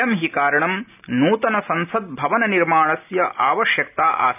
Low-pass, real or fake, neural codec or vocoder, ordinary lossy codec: 3.6 kHz; real; none; none